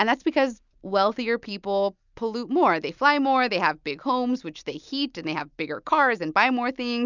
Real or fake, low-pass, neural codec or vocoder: real; 7.2 kHz; none